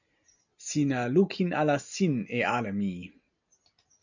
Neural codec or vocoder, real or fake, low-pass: none; real; 7.2 kHz